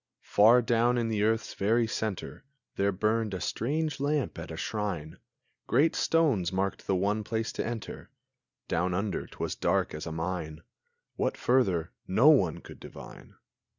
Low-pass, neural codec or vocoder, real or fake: 7.2 kHz; none; real